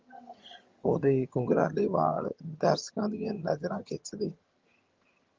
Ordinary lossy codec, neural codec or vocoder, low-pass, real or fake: Opus, 32 kbps; vocoder, 22.05 kHz, 80 mel bands, HiFi-GAN; 7.2 kHz; fake